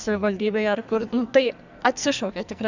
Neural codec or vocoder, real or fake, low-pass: codec, 44.1 kHz, 2.6 kbps, SNAC; fake; 7.2 kHz